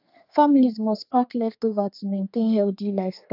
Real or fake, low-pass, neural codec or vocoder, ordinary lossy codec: fake; 5.4 kHz; codec, 32 kHz, 1.9 kbps, SNAC; AAC, 48 kbps